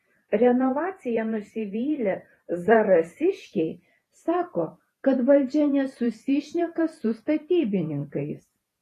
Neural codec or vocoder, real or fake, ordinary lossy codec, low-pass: vocoder, 48 kHz, 128 mel bands, Vocos; fake; AAC, 48 kbps; 14.4 kHz